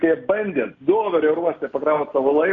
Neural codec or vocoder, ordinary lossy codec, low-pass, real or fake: none; MP3, 64 kbps; 7.2 kHz; real